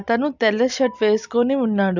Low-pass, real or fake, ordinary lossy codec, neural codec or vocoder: 7.2 kHz; real; none; none